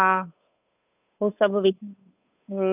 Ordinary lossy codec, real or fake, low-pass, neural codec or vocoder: none; fake; 3.6 kHz; codec, 16 kHz, 8 kbps, FunCodec, trained on Chinese and English, 25 frames a second